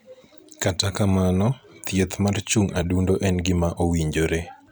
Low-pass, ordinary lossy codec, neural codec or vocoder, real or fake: none; none; vocoder, 44.1 kHz, 128 mel bands every 256 samples, BigVGAN v2; fake